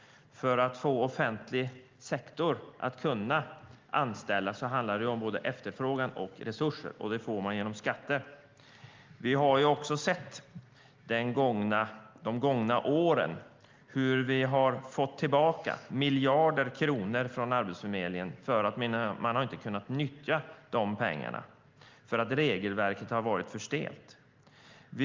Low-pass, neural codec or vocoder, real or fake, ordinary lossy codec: 7.2 kHz; none; real; Opus, 32 kbps